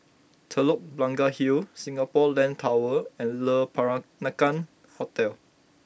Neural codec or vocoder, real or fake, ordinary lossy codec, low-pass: none; real; none; none